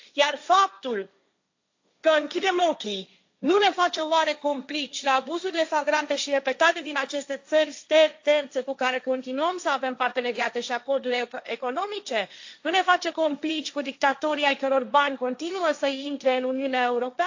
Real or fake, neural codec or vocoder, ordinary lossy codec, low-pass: fake; codec, 16 kHz, 1.1 kbps, Voila-Tokenizer; AAC, 48 kbps; 7.2 kHz